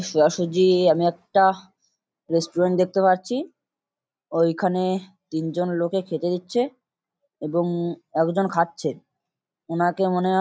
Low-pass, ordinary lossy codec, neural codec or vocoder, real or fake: none; none; none; real